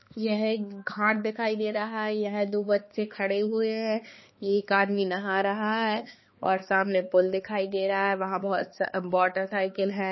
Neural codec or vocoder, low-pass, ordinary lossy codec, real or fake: codec, 16 kHz, 2 kbps, X-Codec, HuBERT features, trained on balanced general audio; 7.2 kHz; MP3, 24 kbps; fake